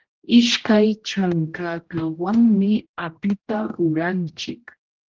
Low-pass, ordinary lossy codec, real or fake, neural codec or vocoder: 7.2 kHz; Opus, 16 kbps; fake; codec, 16 kHz, 0.5 kbps, X-Codec, HuBERT features, trained on general audio